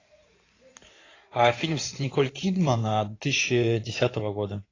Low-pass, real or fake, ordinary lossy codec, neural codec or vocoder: 7.2 kHz; fake; AAC, 32 kbps; codec, 16 kHz in and 24 kHz out, 2.2 kbps, FireRedTTS-2 codec